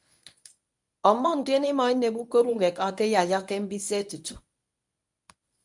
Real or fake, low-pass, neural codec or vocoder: fake; 10.8 kHz; codec, 24 kHz, 0.9 kbps, WavTokenizer, medium speech release version 1